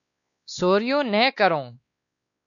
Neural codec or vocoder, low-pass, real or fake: codec, 16 kHz, 2 kbps, X-Codec, WavLM features, trained on Multilingual LibriSpeech; 7.2 kHz; fake